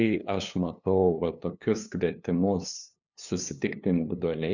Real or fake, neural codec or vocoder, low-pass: fake; codec, 16 kHz, 2 kbps, FunCodec, trained on LibriTTS, 25 frames a second; 7.2 kHz